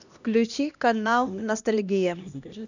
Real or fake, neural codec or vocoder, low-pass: fake; codec, 16 kHz, 1 kbps, X-Codec, HuBERT features, trained on LibriSpeech; 7.2 kHz